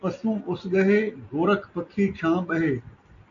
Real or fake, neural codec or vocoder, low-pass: real; none; 7.2 kHz